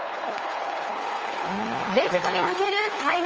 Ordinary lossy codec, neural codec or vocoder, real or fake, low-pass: Opus, 24 kbps; codec, 16 kHz, 4 kbps, FunCodec, trained on LibriTTS, 50 frames a second; fake; 7.2 kHz